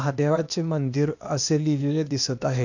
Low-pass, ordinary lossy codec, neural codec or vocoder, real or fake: 7.2 kHz; none; codec, 16 kHz, 0.8 kbps, ZipCodec; fake